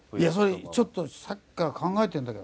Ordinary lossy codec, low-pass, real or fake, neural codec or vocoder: none; none; real; none